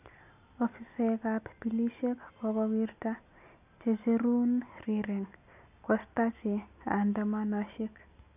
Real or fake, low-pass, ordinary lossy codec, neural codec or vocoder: real; 3.6 kHz; none; none